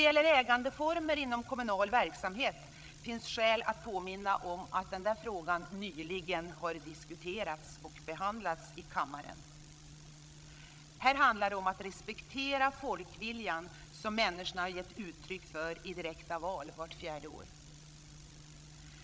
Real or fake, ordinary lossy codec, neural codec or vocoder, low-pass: fake; none; codec, 16 kHz, 16 kbps, FreqCodec, larger model; none